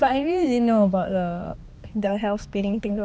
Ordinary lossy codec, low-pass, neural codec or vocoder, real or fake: none; none; codec, 16 kHz, 2 kbps, X-Codec, HuBERT features, trained on balanced general audio; fake